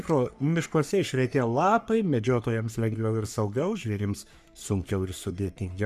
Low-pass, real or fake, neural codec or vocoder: 14.4 kHz; fake; codec, 44.1 kHz, 3.4 kbps, Pupu-Codec